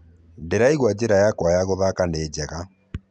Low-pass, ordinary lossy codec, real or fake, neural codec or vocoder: 9.9 kHz; none; real; none